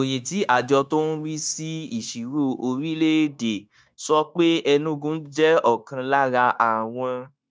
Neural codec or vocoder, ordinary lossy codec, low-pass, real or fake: codec, 16 kHz, 0.9 kbps, LongCat-Audio-Codec; none; none; fake